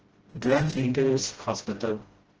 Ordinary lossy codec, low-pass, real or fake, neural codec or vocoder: Opus, 16 kbps; 7.2 kHz; fake; codec, 16 kHz, 0.5 kbps, FreqCodec, smaller model